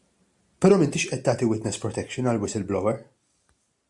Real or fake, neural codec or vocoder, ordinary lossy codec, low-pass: real; none; AAC, 64 kbps; 10.8 kHz